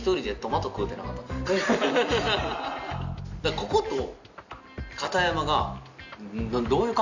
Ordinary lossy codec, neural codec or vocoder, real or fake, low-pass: none; none; real; 7.2 kHz